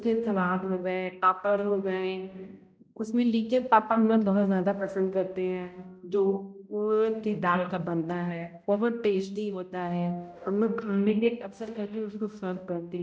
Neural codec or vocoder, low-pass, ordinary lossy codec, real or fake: codec, 16 kHz, 0.5 kbps, X-Codec, HuBERT features, trained on balanced general audio; none; none; fake